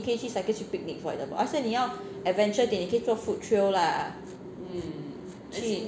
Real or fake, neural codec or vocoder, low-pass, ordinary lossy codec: real; none; none; none